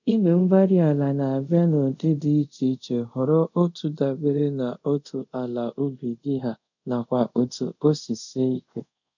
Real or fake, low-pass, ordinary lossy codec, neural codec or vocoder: fake; 7.2 kHz; none; codec, 24 kHz, 0.5 kbps, DualCodec